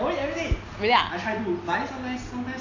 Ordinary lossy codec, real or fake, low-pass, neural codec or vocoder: AAC, 48 kbps; real; 7.2 kHz; none